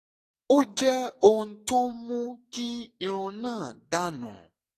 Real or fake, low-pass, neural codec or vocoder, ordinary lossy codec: fake; 14.4 kHz; codec, 44.1 kHz, 2.6 kbps, SNAC; AAC, 48 kbps